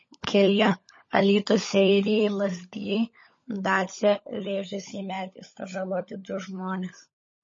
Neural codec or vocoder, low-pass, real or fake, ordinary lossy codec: codec, 16 kHz, 16 kbps, FunCodec, trained on LibriTTS, 50 frames a second; 7.2 kHz; fake; MP3, 32 kbps